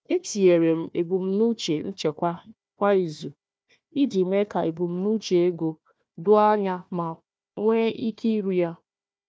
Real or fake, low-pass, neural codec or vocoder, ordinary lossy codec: fake; none; codec, 16 kHz, 1 kbps, FunCodec, trained on Chinese and English, 50 frames a second; none